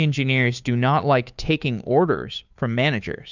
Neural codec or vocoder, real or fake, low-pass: codec, 16 kHz, 2 kbps, FunCodec, trained on Chinese and English, 25 frames a second; fake; 7.2 kHz